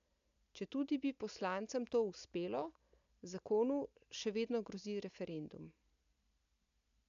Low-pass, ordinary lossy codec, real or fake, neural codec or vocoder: 7.2 kHz; AAC, 96 kbps; real; none